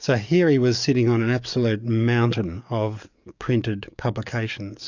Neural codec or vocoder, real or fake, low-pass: codec, 44.1 kHz, 7.8 kbps, DAC; fake; 7.2 kHz